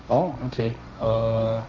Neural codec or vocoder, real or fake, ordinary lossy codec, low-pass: codec, 16 kHz, 1.1 kbps, Voila-Tokenizer; fake; none; none